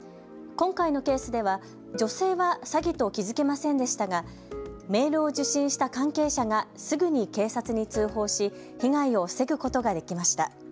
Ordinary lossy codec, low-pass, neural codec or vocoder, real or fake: none; none; none; real